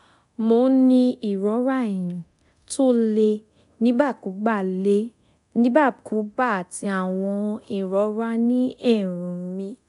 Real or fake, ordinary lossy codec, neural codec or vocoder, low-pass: fake; MP3, 96 kbps; codec, 24 kHz, 0.9 kbps, DualCodec; 10.8 kHz